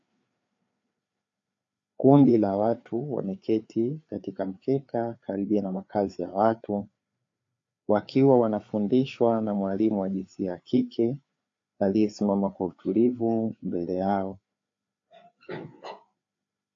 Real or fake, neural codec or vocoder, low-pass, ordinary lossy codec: fake; codec, 16 kHz, 4 kbps, FreqCodec, larger model; 7.2 kHz; AAC, 48 kbps